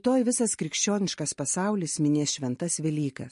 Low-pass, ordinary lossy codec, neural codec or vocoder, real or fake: 10.8 kHz; MP3, 48 kbps; none; real